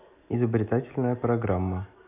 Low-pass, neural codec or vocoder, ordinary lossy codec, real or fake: 3.6 kHz; none; AAC, 32 kbps; real